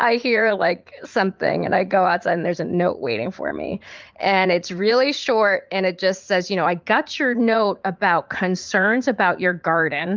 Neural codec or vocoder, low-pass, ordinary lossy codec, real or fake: vocoder, 44.1 kHz, 80 mel bands, Vocos; 7.2 kHz; Opus, 32 kbps; fake